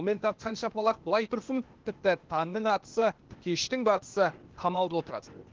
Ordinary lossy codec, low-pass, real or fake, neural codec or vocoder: Opus, 16 kbps; 7.2 kHz; fake; codec, 16 kHz, 0.8 kbps, ZipCodec